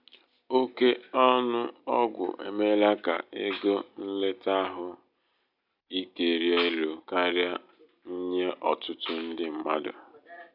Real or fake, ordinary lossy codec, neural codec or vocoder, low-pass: real; none; none; 5.4 kHz